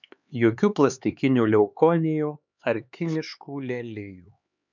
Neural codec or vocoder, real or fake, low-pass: codec, 16 kHz, 4 kbps, X-Codec, HuBERT features, trained on LibriSpeech; fake; 7.2 kHz